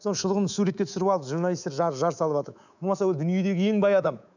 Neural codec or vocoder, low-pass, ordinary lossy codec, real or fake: codec, 24 kHz, 3.1 kbps, DualCodec; 7.2 kHz; none; fake